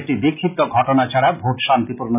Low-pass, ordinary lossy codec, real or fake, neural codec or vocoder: 3.6 kHz; none; real; none